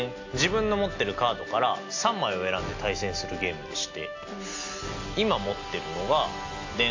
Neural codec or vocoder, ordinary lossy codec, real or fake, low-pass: none; AAC, 48 kbps; real; 7.2 kHz